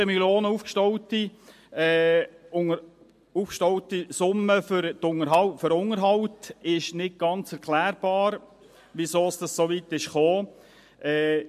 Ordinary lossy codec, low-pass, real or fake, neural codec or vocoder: MP3, 64 kbps; 14.4 kHz; real; none